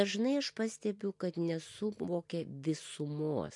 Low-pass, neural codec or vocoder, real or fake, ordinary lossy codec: 10.8 kHz; none; real; MP3, 64 kbps